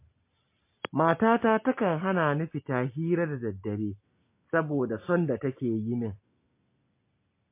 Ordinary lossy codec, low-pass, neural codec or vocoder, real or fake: MP3, 24 kbps; 3.6 kHz; none; real